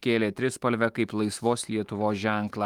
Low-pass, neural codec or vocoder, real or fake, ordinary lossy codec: 19.8 kHz; autoencoder, 48 kHz, 128 numbers a frame, DAC-VAE, trained on Japanese speech; fake; Opus, 32 kbps